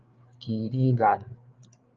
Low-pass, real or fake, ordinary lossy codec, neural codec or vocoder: 7.2 kHz; fake; Opus, 32 kbps; codec, 16 kHz, 4 kbps, FreqCodec, larger model